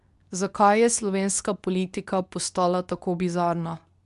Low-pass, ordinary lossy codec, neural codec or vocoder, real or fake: 10.8 kHz; none; codec, 24 kHz, 0.9 kbps, WavTokenizer, medium speech release version 2; fake